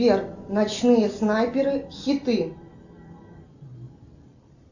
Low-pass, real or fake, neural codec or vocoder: 7.2 kHz; real; none